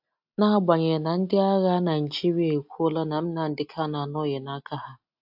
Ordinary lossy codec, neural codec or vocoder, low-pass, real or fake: AAC, 48 kbps; none; 5.4 kHz; real